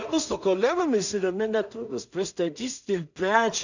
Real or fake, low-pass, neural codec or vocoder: fake; 7.2 kHz; codec, 16 kHz in and 24 kHz out, 0.4 kbps, LongCat-Audio-Codec, two codebook decoder